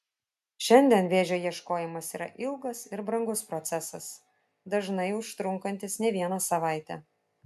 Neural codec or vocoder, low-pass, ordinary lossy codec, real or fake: none; 14.4 kHz; MP3, 96 kbps; real